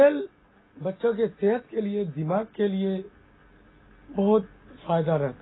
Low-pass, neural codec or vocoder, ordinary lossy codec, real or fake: 7.2 kHz; none; AAC, 16 kbps; real